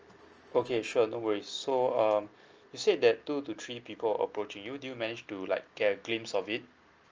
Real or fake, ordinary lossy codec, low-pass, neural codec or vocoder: real; Opus, 24 kbps; 7.2 kHz; none